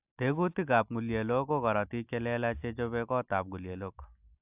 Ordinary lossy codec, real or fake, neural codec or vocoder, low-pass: AAC, 32 kbps; real; none; 3.6 kHz